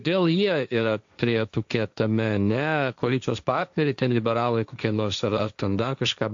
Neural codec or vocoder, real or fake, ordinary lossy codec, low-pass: codec, 16 kHz, 1.1 kbps, Voila-Tokenizer; fake; AAC, 64 kbps; 7.2 kHz